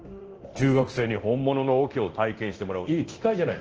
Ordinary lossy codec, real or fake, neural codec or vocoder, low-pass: Opus, 16 kbps; fake; codec, 24 kHz, 0.9 kbps, DualCodec; 7.2 kHz